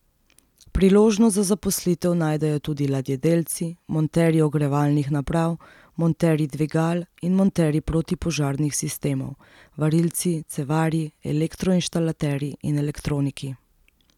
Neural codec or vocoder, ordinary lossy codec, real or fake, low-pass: none; none; real; 19.8 kHz